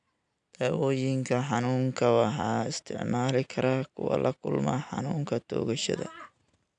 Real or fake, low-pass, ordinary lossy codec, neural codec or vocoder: real; 9.9 kHz; none; none